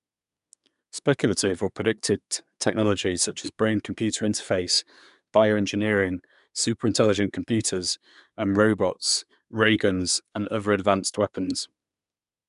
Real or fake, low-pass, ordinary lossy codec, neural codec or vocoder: fake; 10.8 kHz; none; codec, 24 kHz, 1 kbps, SNAC